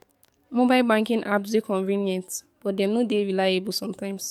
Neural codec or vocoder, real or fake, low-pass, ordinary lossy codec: codec, 44.1 kHz, 7.8 kbps, DAC; fake; 19.8 kHz; MP3, 96 kbps